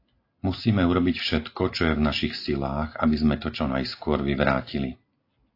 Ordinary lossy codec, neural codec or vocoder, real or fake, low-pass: AAC, 32 kbps; none; real; 5.4 kHz